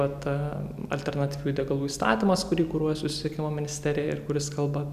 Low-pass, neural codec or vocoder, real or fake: 14.4 kHz; none; real